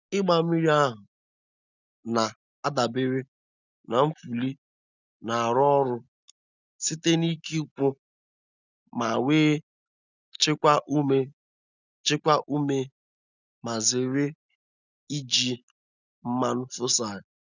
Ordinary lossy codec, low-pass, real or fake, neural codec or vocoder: none; 7.2 kHz; real; none